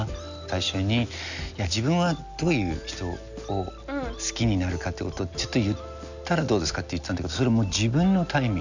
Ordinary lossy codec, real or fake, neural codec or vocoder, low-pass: none; real; none; 7.2 kHz